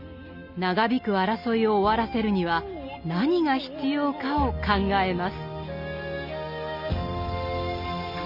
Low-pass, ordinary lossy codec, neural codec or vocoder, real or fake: 5.4 kHz; none; none; real